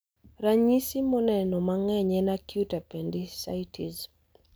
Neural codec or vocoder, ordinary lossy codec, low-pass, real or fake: none; none; none; real